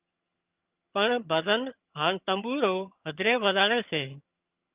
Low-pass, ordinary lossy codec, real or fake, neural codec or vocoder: 3.6 kHz; Opus, 24 kbps; fake; vocoder, 22.05 kHz, 80 mel bands, HiFi-GAN